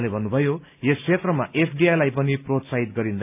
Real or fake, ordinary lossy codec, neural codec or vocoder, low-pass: real; AAC, 32 kbps; none; 3.6 kHz